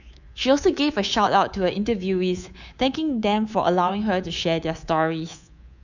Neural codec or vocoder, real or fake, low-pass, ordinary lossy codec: codec, 24 kHz, 3.1 kbps, DualCodec; fake; 7.2 kHz; none